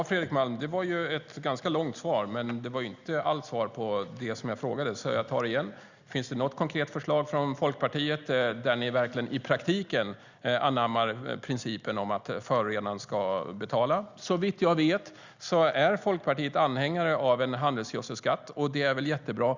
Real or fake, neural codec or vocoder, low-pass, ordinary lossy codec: real; none; 7.2 kHz; Opus, 64 kbps